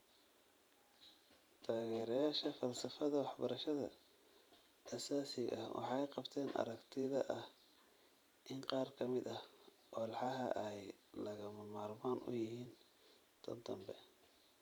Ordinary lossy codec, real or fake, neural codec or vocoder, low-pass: none; fake; vocoder, 44.1 kHz, 128 mel bands every 512 samples, BigVGAN v2; none